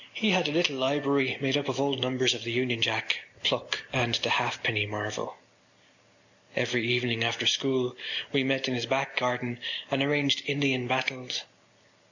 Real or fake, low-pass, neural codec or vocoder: real; 7.2 kHz; none